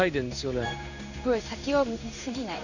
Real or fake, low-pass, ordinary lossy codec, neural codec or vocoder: fake; 7.2 kHz; none; codec, 16 kHz in and 24 kHz out, 1 kbps, XY-Tokenizer